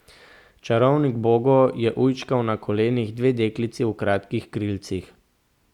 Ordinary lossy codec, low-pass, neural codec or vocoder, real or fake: Opus, 64 kbps; 19.8 kHz; none; real